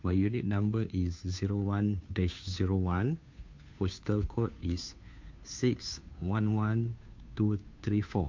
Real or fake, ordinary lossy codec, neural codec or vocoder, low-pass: fake; MP3, 48 kbps; codec, 16 kHz, 2 kbps, FunCodec, trained on Chinese and English, 25 frames a second; 7.2 kHz